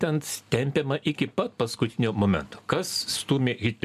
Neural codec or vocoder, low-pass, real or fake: none; 14.4 kHz; real